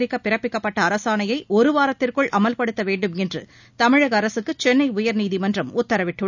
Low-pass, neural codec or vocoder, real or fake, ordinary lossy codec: 7.2 kHz; none; real; none